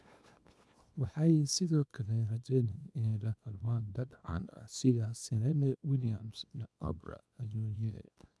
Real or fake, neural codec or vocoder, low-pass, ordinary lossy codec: fake; codec, 24 kHz, 0.9 kbps, WavTokenizer, small release; none; none